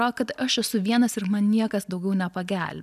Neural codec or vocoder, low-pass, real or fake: none; 14.4 kHz; real